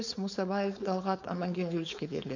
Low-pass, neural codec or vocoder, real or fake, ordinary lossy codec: 7.2 kHz; codec, 16 kHz, 4.8 kbps, FACodec; fake; none